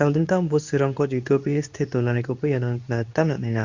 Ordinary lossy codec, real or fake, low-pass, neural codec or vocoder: Opus, 64 kbps; fake; 7.2 kHz; codec, 24 kHz, 0.9 kbps, WavTokenizer, medium speech release version 2